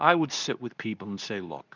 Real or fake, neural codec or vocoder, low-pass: fake; codec, 24 kHz, 0.9 kbps, WavTokenizer, medium speech release version 2; 7.2 kHz